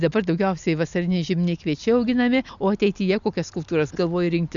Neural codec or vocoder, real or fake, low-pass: none; real; 7.2 kHz